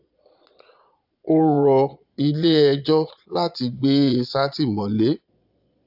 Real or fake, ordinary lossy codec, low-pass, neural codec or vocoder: fake; AAC, 48 kbps; 5.4 kHz; vocoder, 22.05 kHz, 80 mel bands, Vocos